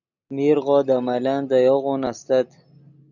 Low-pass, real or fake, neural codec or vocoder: 7.2 kHz; real; none